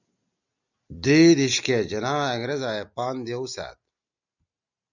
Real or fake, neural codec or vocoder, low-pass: real; none; 7.2 kHz